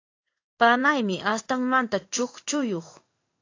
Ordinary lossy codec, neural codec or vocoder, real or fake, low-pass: AAC, 48 kbps; codec, 16 kHz in and 24 kHz out, 1 kbps, XY-Tokenizer; fake; 7.2 kHz